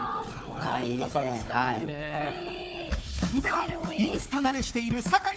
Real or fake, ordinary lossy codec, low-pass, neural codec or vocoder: fake; none; none; codec, 16 kHz, 4 kbps, FunCodec, trained on Chinese and English, 50 frames a second